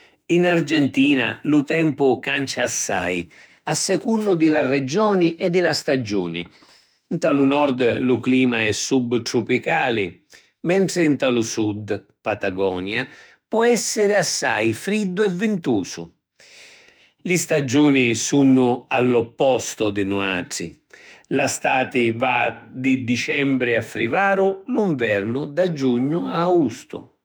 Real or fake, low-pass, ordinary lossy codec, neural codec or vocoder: fake; none; none; autoencoder, 48 kHz, 32 numbers a frame, DAC-VAE, trained on Japanese speech